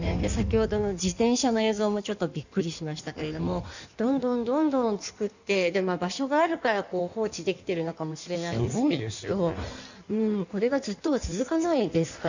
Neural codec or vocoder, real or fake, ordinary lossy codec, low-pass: codec, 16 kHz in and 24 kHz out, 1.1 kbps, FireRedTTS-2 codec; fake; none; 7.2 kHz